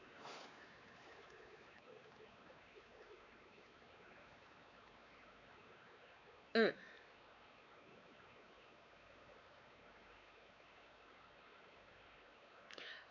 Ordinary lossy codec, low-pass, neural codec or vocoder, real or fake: none; 7.2 kHz; codec, 16 kHz, 4 kbps, X-Codec, WavLM features, trained on Multilingual LibriSpeech; fake